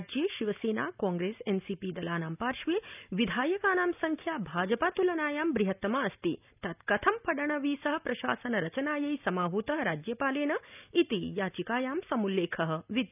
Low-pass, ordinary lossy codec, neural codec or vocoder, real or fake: 3.6 kHz; none; none; real